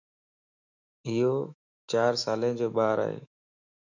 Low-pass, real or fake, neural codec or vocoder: 7.2 kHz; fake; autoencoder, 48 kHz, 128 numbers a frame, DAC-VAE, trained on Japanese speech